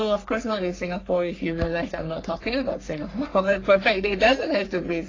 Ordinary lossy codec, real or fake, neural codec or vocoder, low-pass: AAC, 32 kbps; fake; codec, 44.1 kHz, 3.4 kbps, Pupu-Codec; 7.2 kHz